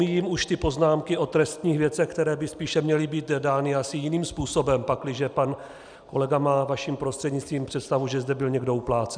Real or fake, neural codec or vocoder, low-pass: real; none; 9.9 kHz